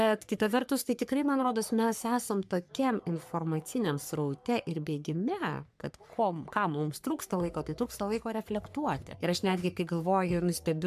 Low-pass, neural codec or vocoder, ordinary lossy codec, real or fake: 14.4 kHz; codec, 44.1 kHz, 3.4 kbps, Pupu-Codec; MP3, 96 kbps; fake